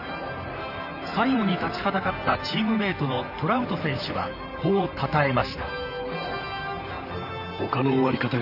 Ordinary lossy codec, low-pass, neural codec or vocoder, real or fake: none; 5.4 kHz; vocoder, 44.1 kHz, 128 mel bands, Pupu-Vocoder; fake